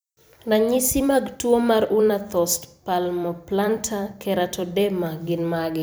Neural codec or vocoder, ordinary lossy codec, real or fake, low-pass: vocoder, 44.1 kHz, 128 mel bands every 256 samples, BigVGAN v2; none; fake; none